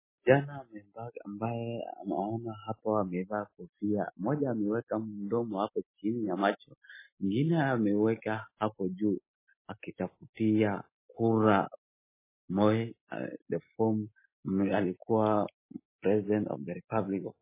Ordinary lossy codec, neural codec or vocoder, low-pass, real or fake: MP3, 16 kbps; none; 3.6 kHz; real